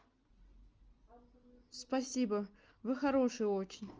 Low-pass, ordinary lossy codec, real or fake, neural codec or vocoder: 7.2 kHz; Opus, 24 kbps; real; none